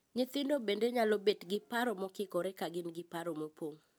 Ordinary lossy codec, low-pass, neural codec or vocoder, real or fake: none; none; none; real